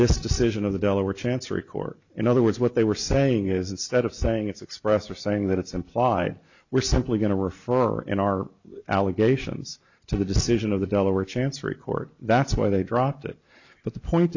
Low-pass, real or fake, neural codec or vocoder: 7.2 kHz; real; none